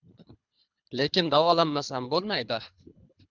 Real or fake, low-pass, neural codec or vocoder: fake; 7.2 kHz; codec, 24 kHz, 3 kbps, HILCodec